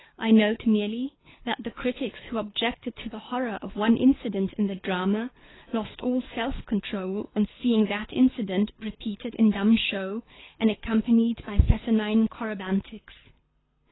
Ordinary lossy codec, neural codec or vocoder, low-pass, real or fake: AAC, 16 kbps; codec, 24 kHz, 6 kbps, HILCodec; 7.2 kHz; fake